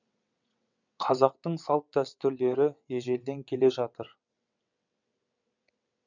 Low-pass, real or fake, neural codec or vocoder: 7.2 kHz; fake; vocoder, 22.05 kHz, 80 mel bands, WaveNeXt